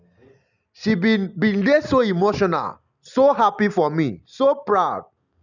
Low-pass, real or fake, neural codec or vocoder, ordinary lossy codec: 7.2 kHz; real; none; none